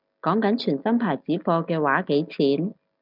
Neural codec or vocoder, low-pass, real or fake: none; 5.4 kHz; real